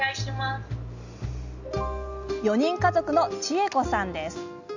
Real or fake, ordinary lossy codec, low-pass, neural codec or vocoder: real; none; 7.2 kHz; none